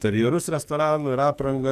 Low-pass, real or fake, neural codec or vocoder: 14.4 kHz; fake; codec, 44.1 kHz, 2.6 kbps, SNAC